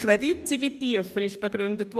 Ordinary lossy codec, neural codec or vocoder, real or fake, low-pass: none; codec, 44.1 kHz, 2.6 kbps, DAC; fake; 14.4 kHz